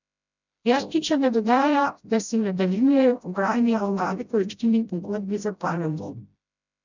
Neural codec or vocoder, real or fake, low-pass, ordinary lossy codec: codec, 16 kHz, 0.5 kbps, FreqCodec, smaller model; fake; 7.2 kHz; none